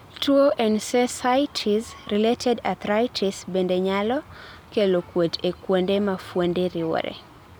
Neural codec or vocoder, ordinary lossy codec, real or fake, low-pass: none; none; real; none